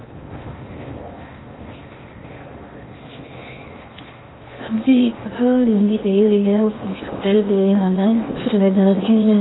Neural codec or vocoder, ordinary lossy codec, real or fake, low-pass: codec, 16 kHz in and 24 kHz out, 0.8 kbps, FocalCodec, streaming, 65536 codes; AAC, 16 kbps; fake; 7.2 kHz